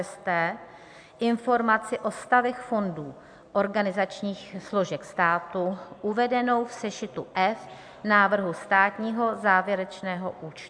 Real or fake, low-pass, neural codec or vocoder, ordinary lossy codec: real; 9.9 kHz; none; AAC, 64 kbps